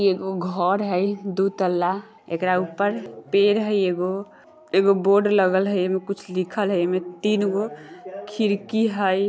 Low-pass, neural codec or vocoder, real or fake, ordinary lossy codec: none; none; real; none